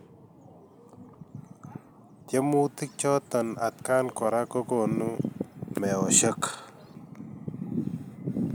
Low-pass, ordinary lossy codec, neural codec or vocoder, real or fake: none; none; none; real